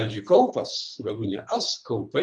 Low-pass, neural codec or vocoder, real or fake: 9.9 kHz; codec, 24 kHz, 3 kbps, HILCodec; fake